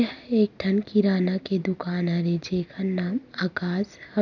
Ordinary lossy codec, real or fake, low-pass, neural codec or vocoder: none; real; 7.2 kHz; none